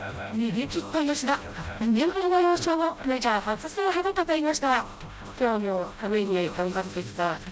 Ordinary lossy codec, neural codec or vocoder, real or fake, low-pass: none; codec, 16 kHz, 0.5 kbps, FreqCodec, smaller model; fake; none